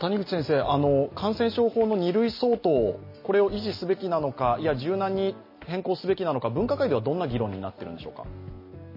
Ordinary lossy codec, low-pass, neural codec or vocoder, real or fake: MP3, 24 kbps; 5.4 kHz; none; real